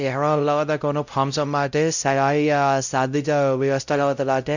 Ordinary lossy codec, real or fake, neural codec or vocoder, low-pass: none; fake; codec, 16 kHz, 0.5 kbps, X-Codec, WavLM features, trained on Multilingual LibriSpeech; 7.2 kHz